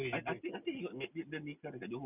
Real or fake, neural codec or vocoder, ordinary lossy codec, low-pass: real; none; none; 3.6 kHz